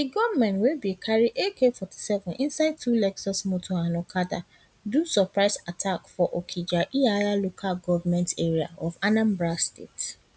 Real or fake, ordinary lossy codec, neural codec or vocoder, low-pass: real; none; none; none